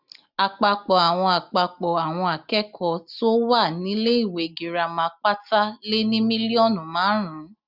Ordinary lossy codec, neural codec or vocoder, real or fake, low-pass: none; none; real; 5.4 kHz